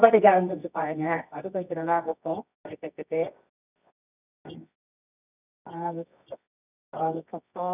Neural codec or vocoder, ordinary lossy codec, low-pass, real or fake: codec, 24 kHz, 0.9 kbps, WavTokenizer, medium music audio release; none; 3.6 kHz; fake